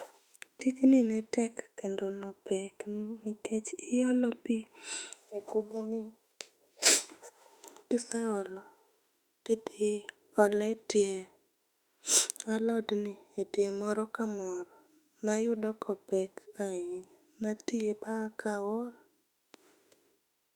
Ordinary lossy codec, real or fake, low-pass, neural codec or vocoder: Opus, 64 kbps; fake; 19.8 kHz; autoencoder, 48 kHz, 32 numbers a frame, DAC-VAE, trained on Japanese speech